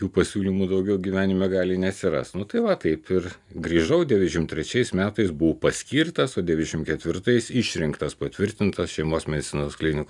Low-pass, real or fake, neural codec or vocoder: 10.8 kHz; real; none